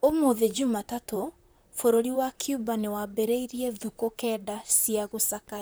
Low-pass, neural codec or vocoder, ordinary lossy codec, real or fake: none; vocoder, 44.1 kHz, 128 mel bands, Pupu-Vocoder; none; fake